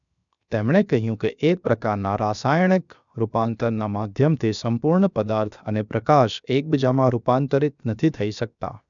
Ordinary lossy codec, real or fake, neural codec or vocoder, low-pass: none; fake; codec, 16 kHz, 0.7 kbps, FocalCodec; 7.2 kHz